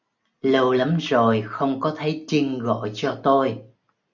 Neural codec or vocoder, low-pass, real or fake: none; 7.2 kHz; real